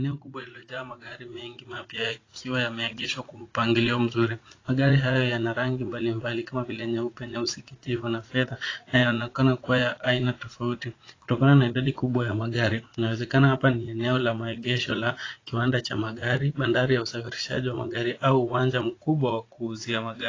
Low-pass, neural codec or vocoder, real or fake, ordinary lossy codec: 7.2 kHz; vocoder, 44.1 kHz, 80 mel bands, Vocos; fake; AAC, 32 kbps